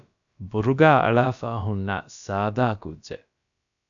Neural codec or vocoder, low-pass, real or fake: codec, 16 kHz, about 1 kbps, DyCAST, with the encoder's durations; 7.2 kHz; fake